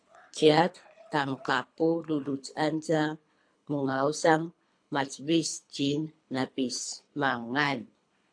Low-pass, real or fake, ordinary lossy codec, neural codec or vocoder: 9.9 kHz; fake; AAC, 64 kbps; codec, 24 kHz, 3 kbps, HILCodec